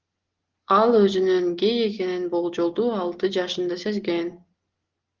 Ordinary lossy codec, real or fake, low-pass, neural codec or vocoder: Opus, 16 kbps; real; 7.2 kHz; none